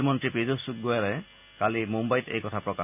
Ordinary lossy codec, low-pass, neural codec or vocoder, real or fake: none; 3.6 kHz; none; real